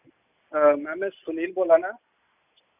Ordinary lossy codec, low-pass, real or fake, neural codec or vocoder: none; 3.6 kHz; real; none